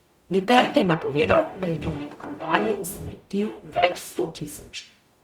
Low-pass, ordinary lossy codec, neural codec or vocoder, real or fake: 19.8 kHz; Opus, 64 kbps; codec, 44.1 kHz, 0.9 kbps, DAC; fake